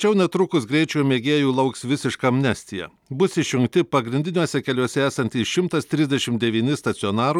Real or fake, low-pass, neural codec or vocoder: real; 19.8 kHz; none